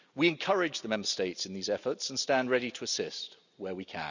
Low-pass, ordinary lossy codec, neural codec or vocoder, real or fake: 7.2 kHz; none; none; real